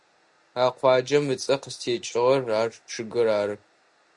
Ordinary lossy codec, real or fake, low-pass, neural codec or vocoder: Opus, 64 kbps; real; 10.8 kHz; none